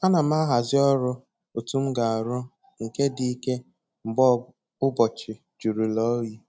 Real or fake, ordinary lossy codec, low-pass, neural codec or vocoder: real; none; none; none